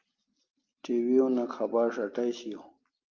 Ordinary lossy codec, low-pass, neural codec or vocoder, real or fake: Opus, 24 kbps; 7.2 kHz; none; real